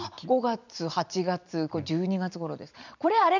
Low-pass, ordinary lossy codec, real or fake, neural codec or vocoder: 7.2 kHz; none; fake; vocoder, 44.1 kHz, 128 mel bands every 256 samples, BigVGAN v2